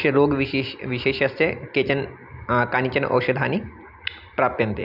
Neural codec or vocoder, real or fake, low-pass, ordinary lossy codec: none; real; 5.4 kHz; AAC, 48 kbps